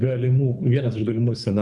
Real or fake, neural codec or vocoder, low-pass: fake; codec, 24 kHz, 3 kbps, HILCodec; 10.8 kHz